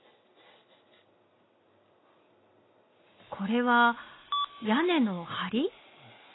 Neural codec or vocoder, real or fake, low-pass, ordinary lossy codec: none; real; 7.2 kHz; AAC, 16 kbps